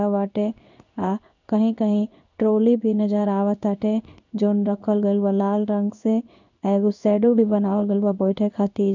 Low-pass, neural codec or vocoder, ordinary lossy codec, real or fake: 7.2 kHz; codec, 16 kHz in and 24 kHz out, 1 kbps, XY-Tokenizer; none; fake